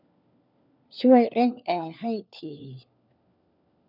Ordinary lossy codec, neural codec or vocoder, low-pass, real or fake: none; codec, 16 kHz, 4 kbps, FunCodec, trained on LibriTTS, 50 frames a second; 5.4 kHz; fake